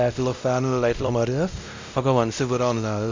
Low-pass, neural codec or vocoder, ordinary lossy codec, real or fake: 7.2 kHz; codec, 16 kHz, 0.5 kbps, X-Codec, WavLM features, trained on Multilingual LibriSpeech; MP3, 64 kbps; fake